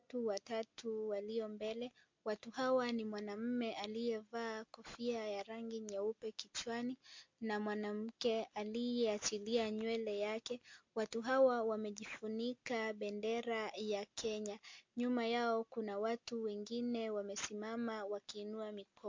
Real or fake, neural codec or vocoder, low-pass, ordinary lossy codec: real; none; 7.2 kHz; MP3, 48 kbps